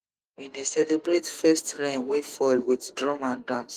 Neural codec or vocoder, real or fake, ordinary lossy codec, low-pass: autoencoder, 48 kHz, 32 numbers a frame, DAC-VAE, trained on Japanese speech; fake; Opus, 24 kbps; 14.4 kHz